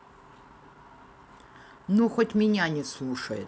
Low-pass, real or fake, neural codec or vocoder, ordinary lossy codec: none; real; none; none